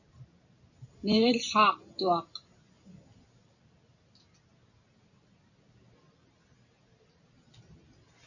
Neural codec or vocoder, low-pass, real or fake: none; 7.2 kHz; real